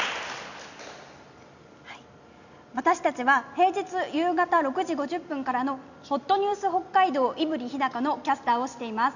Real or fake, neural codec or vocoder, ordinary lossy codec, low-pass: real; none; none; 7.2 kHz